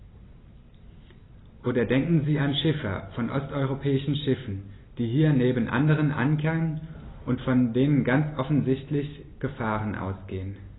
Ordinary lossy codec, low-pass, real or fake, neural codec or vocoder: AAC, 16 kbps; 7.2 kHz; real; none